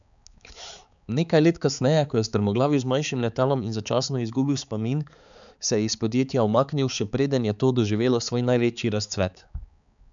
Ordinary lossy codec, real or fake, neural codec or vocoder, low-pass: none; fake; codec, 16 kHz, 4 kbps, X-Codec, HuBERT features, trained on balanced general audio; 7.2 kHz